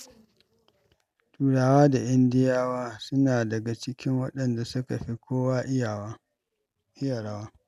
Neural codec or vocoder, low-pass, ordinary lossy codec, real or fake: none; 14.4 kHz; none; real